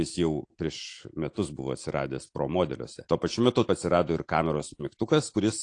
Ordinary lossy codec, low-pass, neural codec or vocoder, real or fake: AAC, 48 kbps; 9.9 kHz; none; real